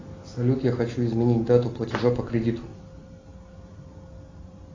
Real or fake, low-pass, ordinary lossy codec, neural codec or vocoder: real; 7.2 kHz; AAC, 32 kbps; none